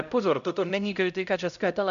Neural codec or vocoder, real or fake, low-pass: codec, 16 kHz, 0.5 kbps, X-Codec, HuBERT features, trained on LibriSpeech; fake; 7.2 kHz